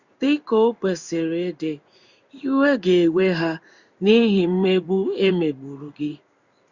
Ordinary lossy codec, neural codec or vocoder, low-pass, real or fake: Opus, 64 kbps; codec, 16 kHz in and 24 kHz out, 1 kbps, XY-Tokenizer; 7.2 kHz; fake